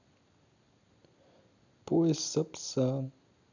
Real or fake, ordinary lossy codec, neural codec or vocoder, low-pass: real; none; none; 7.2 kHz